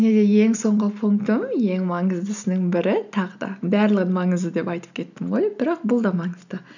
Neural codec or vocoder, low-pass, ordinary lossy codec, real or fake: none; 7.2 kHz; none; real